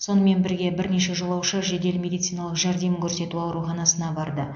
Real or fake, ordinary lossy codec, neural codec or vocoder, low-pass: real; AAC, 64 kbps; none; 7.2 kHz